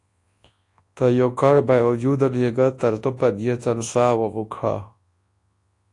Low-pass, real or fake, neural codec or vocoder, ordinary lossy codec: 10.8 kHz; fake; codec, 24 kHz, 0.9 kbps, WavTokenizer, large speech release; AAC, 48 kbps